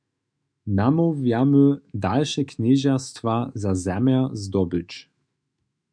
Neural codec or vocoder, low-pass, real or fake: autoencoder, 48 kHz, 128 numbers a frame, DAC-VAE, trained on Japanese speech; 9.9 kHz; fake